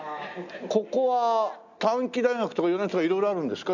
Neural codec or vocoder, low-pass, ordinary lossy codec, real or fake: none; 7.2 kHz; none; real